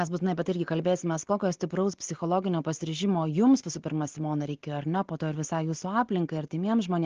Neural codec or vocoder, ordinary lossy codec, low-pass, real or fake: none; Opus, 16 kbps; 7.2 kHz; real